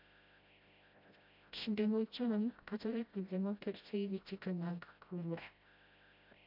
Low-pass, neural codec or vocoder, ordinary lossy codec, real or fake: 5.4 kHz; codec, 16 kHz, 0.5 kbps, FreqCodec, smaller model; MP3, 48 kbps; fake